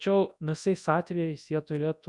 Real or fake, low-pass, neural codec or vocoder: fake; 10.8 kHz; codec, 24 kHz, 0.9 kbps, WavTokenizer, large speech release